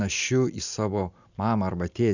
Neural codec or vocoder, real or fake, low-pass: none; real; 7.2 kHz